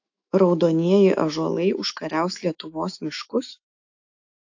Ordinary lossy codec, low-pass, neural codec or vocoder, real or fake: AAC, 48 kbps; 7.2 kHz; autoencoder, 48 kHz, 128 numbers a frame, DAC-VAE, trained on Japanese speech; fake